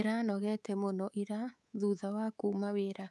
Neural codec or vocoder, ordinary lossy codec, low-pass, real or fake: codec, 24 kHz, 3.1 kbps, DualCodec; none; none; fake